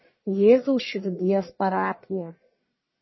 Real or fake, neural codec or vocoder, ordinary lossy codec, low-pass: fake; codec, 44.1 kHz, 1.7 kbps, Pupu-Codec; MP3, 24 kbps; 7.2 kHz